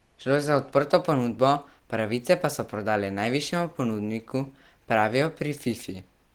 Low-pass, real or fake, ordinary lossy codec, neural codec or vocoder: 19.8 kHz; real; Opus, 16 kbps; none